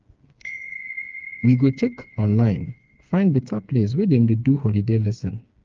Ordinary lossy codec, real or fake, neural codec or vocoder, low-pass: Opus, 24 kbps; fake; codec, 16 kHz, 4 kbps, FreqCodec, smaller model; 7.2 kHz